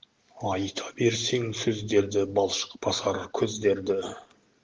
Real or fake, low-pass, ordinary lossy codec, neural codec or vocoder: real; 7.2 kHz; Opus, 16 kbps; none